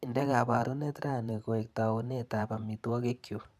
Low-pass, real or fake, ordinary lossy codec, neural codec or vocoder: 14.4 kHz; fake; none; vocoder, 44.1 kHz, 128 mel bands every 512 samples, BigVGAN v2